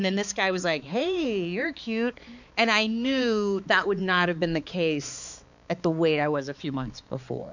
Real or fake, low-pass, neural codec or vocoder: fake; 7.2 kHz; codec, 16 kHz, 4 kbps, X-Codec, HuBERT features, trained on balanced general audio